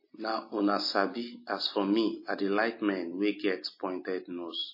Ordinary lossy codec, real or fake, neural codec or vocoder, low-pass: MP3, 24 kbps; real; none; 5.4 kHz